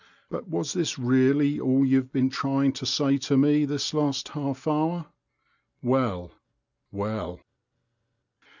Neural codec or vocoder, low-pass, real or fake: none; 7.2 kHz; real